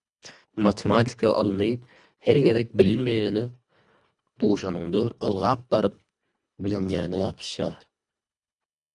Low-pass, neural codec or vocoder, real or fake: 10.8 kHz; codec, 24 kHz, 1.5 kbps, HILCodec; fake